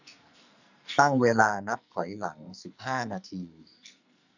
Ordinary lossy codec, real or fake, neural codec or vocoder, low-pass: none; fake; codec, 44.1 kHz, 2.6 kbps, SNAC; 7.2 kHz